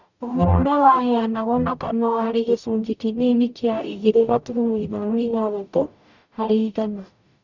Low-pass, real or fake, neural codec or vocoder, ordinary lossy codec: 7.2 kHz; fake; codec, 44.1 kHz, 0.9 kbps, DAC; none